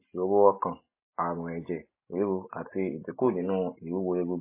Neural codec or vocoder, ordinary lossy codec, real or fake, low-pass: none; MP3, 16 kbps; real; 3.6 kHz